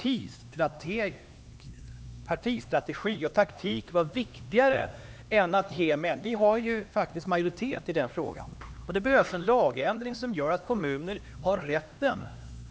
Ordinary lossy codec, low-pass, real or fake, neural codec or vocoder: none; none; fake; codec, 16 kHz, 2 kbps, X-Codec, HuBERT features, trained on LibriSpeech